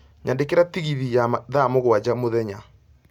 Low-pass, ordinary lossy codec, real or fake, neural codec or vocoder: 19.8 kHz; none; real; none